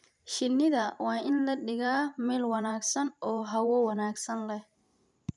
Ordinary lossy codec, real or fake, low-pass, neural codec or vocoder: none; fake; 10.8 kHz; vocoder, 44.1 kHz, 128 mel bands every 512 samples, BigVGAN v2